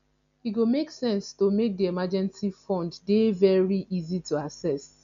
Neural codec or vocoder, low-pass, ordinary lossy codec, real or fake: none; 7.2 kHz; none; real